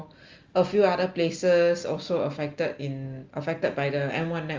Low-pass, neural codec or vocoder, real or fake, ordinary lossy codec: 7.2 kHz; none; real; Opus, 32 kbps